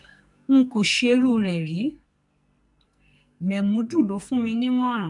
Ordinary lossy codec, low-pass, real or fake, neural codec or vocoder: none; 10.8 kHz; fake; codec, 44.1 kHz, 2.6 kbps, SNAC